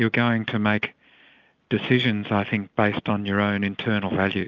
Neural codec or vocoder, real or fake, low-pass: none; real; 7.2 kHz